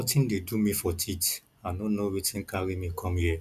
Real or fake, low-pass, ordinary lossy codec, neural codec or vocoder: real; 14.4 kHz; none; none